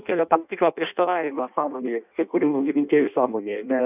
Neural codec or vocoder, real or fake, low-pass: codec, 16 kHz in and 24 kHz out, 0.6 kbps, FireRedTTS-2 codec; fake; 3.6 kHz